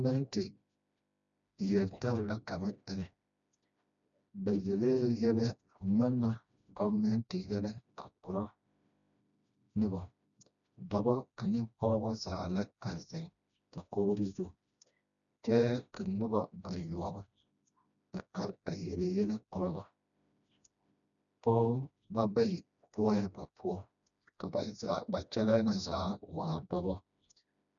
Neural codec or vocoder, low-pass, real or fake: codec, 16 kHz, 1 kbps, FreqCodec, smaller model; 7.2 kHz; fake